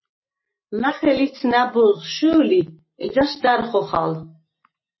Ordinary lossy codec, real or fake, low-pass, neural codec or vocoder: MP3, 24 kbps; real; 7.2 kHz; none